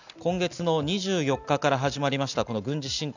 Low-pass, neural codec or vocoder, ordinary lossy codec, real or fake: 7.2 kHz; none; none; real